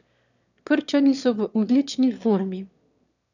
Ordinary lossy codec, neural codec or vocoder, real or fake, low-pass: none; autoencoder, 22.05 kHz, a latent of 192 numbers a frame, VITS, trained on one speaker; fake; 7.2 kHz